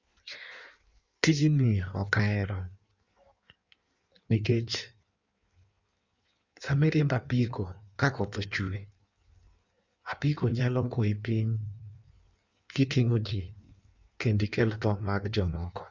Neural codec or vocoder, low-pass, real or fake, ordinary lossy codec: codec, 16 kHz in and 24 kHz out, 1.1 kbps, FireRedTTS-2 codec; 7.2 kHz; fake; Opus, 64 kbps